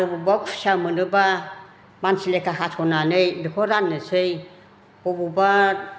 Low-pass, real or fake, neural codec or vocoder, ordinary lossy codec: none; real; none; none